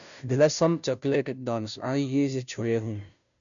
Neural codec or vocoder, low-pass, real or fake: codec, 16 kHz, 0.5 kbps, FunCodec, trained on Chinese and English, 25 frames a second; 7.2 kHz; fake